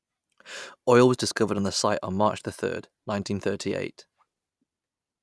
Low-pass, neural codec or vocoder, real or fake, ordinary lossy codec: none; none; real; none